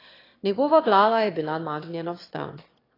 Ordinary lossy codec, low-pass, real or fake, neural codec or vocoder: AAC, 24 kbps; 5.4 kHz; fake; autoencoder, 22.05 kHz, a latent of 192 numbers a frame, VITS, trained on one speaker